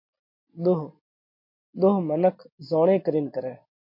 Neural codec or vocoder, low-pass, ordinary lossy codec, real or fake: none; 5.4 kHz; MP3, 32 kbps; real